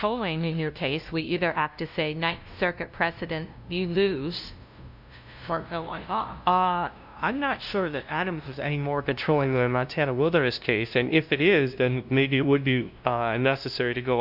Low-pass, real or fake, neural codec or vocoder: 5.4 kHz; fake; codec, 16 kHz, 0.5 kbps, FunCodec, trained on LibriTTS, 25 frames a second